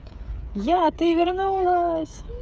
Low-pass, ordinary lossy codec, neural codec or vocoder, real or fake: none; none; codec, 16 kHz, 8 kbps, FreqCodec, smaller model; fake